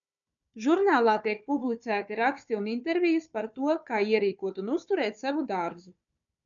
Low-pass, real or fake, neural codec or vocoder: 7.2 kHz; fake; codec, 16 kHz, 4 kbps, FunCodec, trained on Chinese and English, 50 frames a second